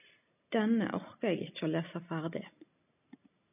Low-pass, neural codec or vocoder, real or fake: 3.6 kHz; none; real